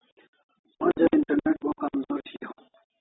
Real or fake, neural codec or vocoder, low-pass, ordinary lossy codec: real; none; 7.2 kHz; AAC, 16 kbps